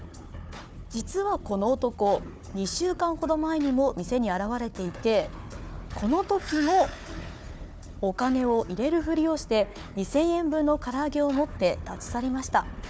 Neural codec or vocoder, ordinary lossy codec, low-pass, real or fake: codec, 16 kHz, 4 kbps, FunCodec, trained on Chinese and English, 50 frames a second; none; none; fake